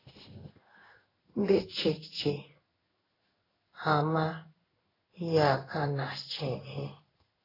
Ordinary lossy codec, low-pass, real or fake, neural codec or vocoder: AAC, 24 kbps; 5.4 kHz; fake; codec, 16 kHz in and 24 kHz out, 1 kbps, XY-Tokenizer